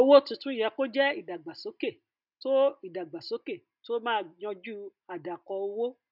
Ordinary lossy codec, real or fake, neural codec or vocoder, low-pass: none; real; none; 5.4 kHz